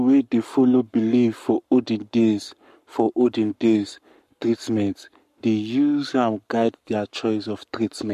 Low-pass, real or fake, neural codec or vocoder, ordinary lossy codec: 14.4 kHz; fake; codec, 44.1 kHz, 7.8 kbps, Pupu-Codec; MP3, 64 kbps